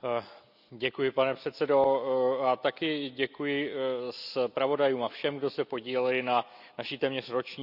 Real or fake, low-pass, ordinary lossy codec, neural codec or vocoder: real; 5.4 kHz; none; none